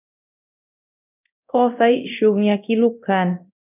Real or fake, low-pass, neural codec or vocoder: fake; 3.6 kHz; codec, 24 kHz, 0.9 kbps, DualCodec